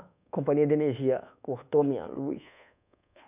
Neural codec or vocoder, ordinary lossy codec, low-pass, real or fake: codec, 24 kHz, 1.2 kbps, DualCodec; none; 3.6 kHz; fake